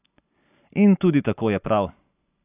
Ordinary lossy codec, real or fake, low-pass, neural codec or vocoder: AAC, 32 kbps; real; 3.6 kHz; none